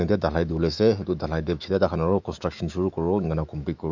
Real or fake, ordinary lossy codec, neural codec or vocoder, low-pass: fake; none; autoencoder, 48 kHz, 128 numbers a frame, DAC-VAE, trained on Japanese speech; 7.2 kHz